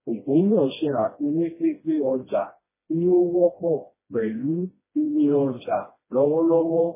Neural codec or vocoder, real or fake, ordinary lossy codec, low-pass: codec, 16 kHz, 1 kbps, FreqCodec, smaller model; fake; MP3, 16 kbps; 3.6 kHz